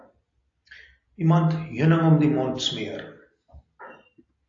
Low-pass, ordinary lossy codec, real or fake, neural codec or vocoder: 7.2 kHz; AAC, 48 kbps; real; none